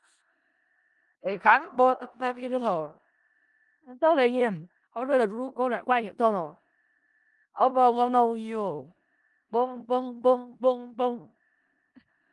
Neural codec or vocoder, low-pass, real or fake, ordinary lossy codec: codec, 16 kHz in and 24 kHz out, 0.4 kbps, LongCat-Audio-Codec, four codebook decoder; 10.8 kHz; fake; Opus, 32 kbps